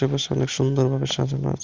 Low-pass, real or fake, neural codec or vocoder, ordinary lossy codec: 7.2 kHz; real; none; Opus, 32 kbps